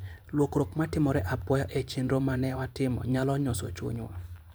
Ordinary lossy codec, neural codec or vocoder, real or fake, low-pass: none; vocoder, 44.1 kHz, 128 mel bands every 512 samples, BigVGAN v2; fake; none